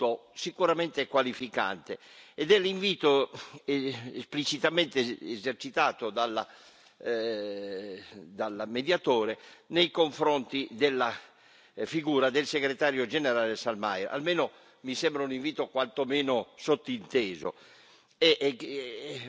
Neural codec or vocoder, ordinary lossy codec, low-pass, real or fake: none; none; none; real